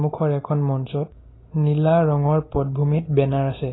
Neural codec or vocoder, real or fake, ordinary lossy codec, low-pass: none; real; AAC, 16 kbps; 7.2 kHz